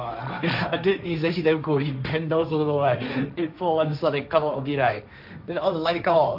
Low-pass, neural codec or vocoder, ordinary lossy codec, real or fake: 5.4 kHz; codec, 16 kHz, 1.1 kbps, Voila-Tokenizer; none; fake